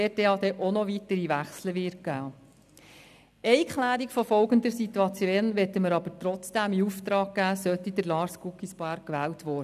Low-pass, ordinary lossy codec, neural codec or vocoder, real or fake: 14.4 kHz; none; none; real